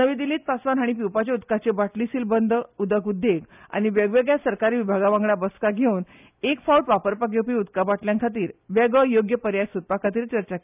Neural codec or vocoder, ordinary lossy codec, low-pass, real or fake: none; none; 3.6 kHz; real